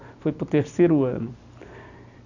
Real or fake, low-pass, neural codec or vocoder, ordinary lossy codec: real; 7.2 kHz; none; none